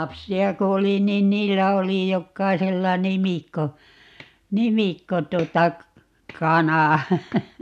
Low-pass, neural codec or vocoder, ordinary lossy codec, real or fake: 14.4 kHz; none; none; real